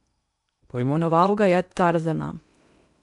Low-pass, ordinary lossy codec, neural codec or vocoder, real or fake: 10.8 kHz; MP3, 96 kbps; codec, 16 kHz in and 24 kHz out, 0.8 kbps, FocalCodec, streaming, 65536 codes; fake